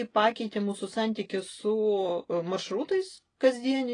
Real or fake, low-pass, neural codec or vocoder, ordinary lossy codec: real; 9.9 kHz; none; AAC, 32 kbps